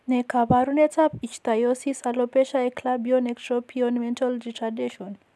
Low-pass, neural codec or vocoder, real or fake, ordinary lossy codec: none; none; real; none